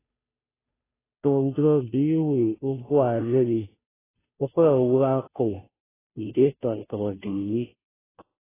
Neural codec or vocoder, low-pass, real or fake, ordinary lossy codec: codec, 16 kHz, 0.5 kbps, FunCodec, trained on Chinese and English, 25 frames a second; 3.6 kHz; fake; AAC, 16 kbps